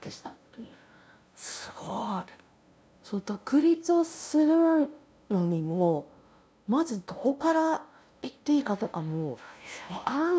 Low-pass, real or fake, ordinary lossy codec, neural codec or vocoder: none; fake; none; codec, 16 kHz, 0.5 kbps, FunCodec, trained on LibriTTS, 25 frames a second